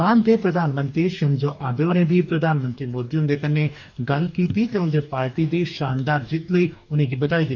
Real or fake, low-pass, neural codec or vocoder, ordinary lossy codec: fake; 7.2 kHz; codec, 44.1 kHz, 2.6 kbps, DAC; none